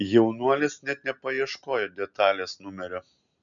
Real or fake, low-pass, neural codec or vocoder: real; 7.2 kHz; none